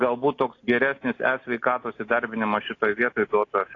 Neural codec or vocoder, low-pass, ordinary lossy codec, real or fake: none; 7.2 kHz; AAC, 32 kbps; real